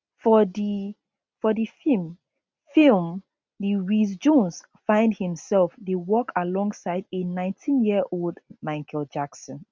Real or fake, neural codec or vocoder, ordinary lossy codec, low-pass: real; none; none; none